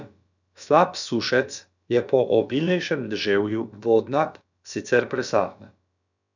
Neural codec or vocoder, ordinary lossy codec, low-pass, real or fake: codec, 16 kHz, about 1 kbps, DyCAST, with the encoder's durations; none; 7.2 kHz; fake